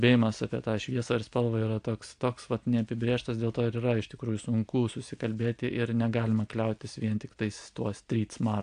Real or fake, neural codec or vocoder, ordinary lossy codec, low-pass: real; none; AAC, 64 kbps; 9.9 kHz